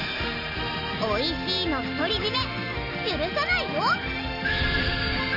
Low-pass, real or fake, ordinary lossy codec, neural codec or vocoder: 5.4 kHz; real; none; none